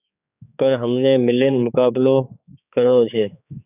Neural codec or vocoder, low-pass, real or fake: codec, 16 kHz, 4 kbps, X-Codec, HuBERT features, trained on general audio; 3.6 kHz; fake